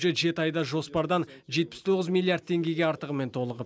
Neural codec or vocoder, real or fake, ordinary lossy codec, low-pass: none; real; none; none